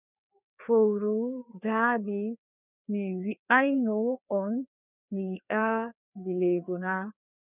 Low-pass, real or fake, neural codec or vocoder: 3.6 kHz; fake; codec, 16 kHz, 2 kbps, FreqCodec, larger model